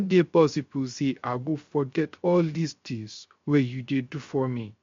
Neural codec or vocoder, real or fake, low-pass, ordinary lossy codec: codec, 16 kHz, 0.3 kbps, FocalCodec; fake; 7.2 kHz; MP3, 48 kbps